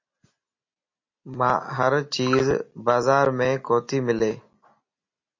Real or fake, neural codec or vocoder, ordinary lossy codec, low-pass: real; none; MP3, 32 kbps; 7.2 kHz